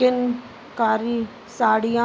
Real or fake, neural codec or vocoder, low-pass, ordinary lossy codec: real; none; none; none